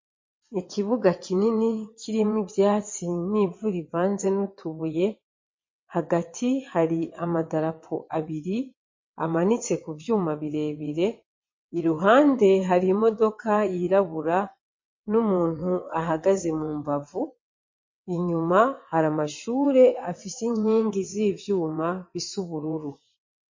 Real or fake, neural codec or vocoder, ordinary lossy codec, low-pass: fake; vocoder, 22.05 kHz, 80 mel bands, WaveNeXt; MP3, 32 kbps; 7.2 kHz